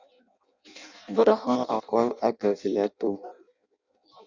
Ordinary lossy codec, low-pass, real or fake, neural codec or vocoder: Opus, 64 kbps; 7.2 kHz; fake; codec, 16 kHz in and 24 kHz out, 0.6 kbps, FireRedTTS-2 codec